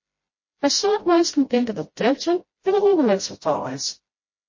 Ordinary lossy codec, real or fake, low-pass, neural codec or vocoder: MP3, 32 kbps; fake; 7.2 kHz; codec, 16 kHz, 0.5 kbps, FreqCodec, smaller model